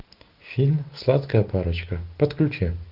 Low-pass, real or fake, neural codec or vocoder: 5.4 kHz; real; none